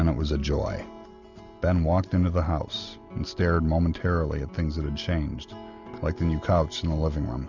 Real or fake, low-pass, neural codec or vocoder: real; 7.2 kHz; none